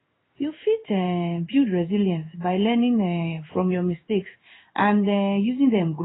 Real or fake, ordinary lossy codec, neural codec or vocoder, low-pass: fake; AAC, 16 kbps; codec, 16 kHz in and 24 kHz out, 1 kbps, XY-Tokenizer; 7.2 kHz